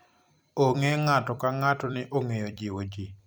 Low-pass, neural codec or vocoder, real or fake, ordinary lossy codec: none; vocoder, 44.1 kHz, 128 mel bands every 256 samples, BigVGAN v2; fake; none